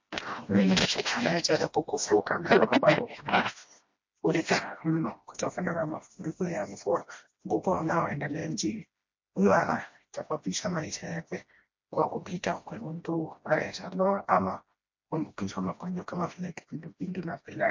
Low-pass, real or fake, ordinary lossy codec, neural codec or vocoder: 7.2 kHz; fake; MP3, 48 kbps; codec, 16 kHz, 1 kbps, FreqCodec, smaller model